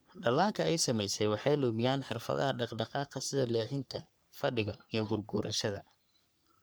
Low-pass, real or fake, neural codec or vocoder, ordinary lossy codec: none; fake; codec, 44.1 kHz, 3.4 kbps, Pupu-Codec; none